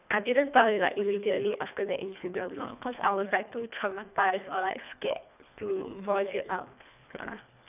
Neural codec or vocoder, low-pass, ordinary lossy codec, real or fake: codec, 24 kHz, 1.5 kbps, HILCodec; 3.6 kHz; none; fake